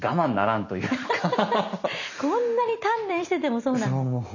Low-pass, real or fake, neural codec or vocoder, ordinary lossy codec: 7.2 kHz; real; none; none